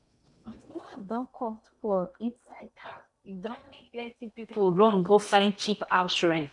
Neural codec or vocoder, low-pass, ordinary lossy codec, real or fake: codec, 16 kHz in and 24 kHz out, 0.8 kbps, FocalCodec, streaming, 65536 codes; 10.8 kHz; none; fake